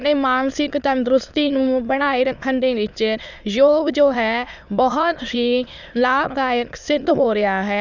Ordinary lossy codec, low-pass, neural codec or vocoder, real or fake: none; 7.2 kHz; autoencoder, 22.05 kHz, a latent of 192 numbers a frame, VITS, trained on many speakers; fake